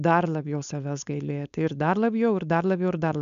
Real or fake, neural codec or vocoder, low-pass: fake; codec, 16 kHz, 4.8 kbps, FACodec; 7.2 kHz